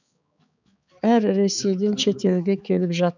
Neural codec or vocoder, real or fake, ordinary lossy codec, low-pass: codec, 16 kHz, 4 kbps, X-Codec, HuBERT features, trained on balanced general audio; fake; none; 7.2 kHz